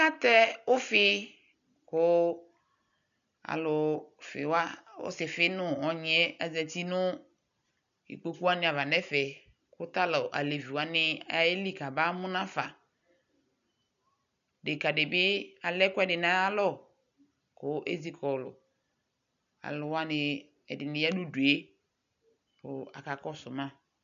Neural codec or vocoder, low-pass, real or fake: none; 7.2 kHz; real